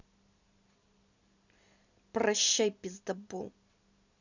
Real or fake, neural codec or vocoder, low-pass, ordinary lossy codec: real; none; 7.2 kHz; none